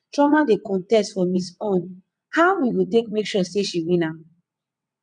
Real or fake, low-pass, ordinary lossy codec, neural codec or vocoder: fake; 9.9 kHz; none; vocoder, 22.05 kHz, 80 mel bands, WaveNeXt